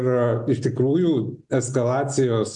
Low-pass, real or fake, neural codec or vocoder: 10.8 kHz; real; none